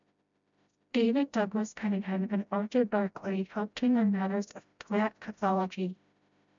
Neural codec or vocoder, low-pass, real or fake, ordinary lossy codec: codec, 16 kHz, 0.5 kbps, FreqCodec, smaller model; 7.2 kHz; fake; MP3, 64 kbps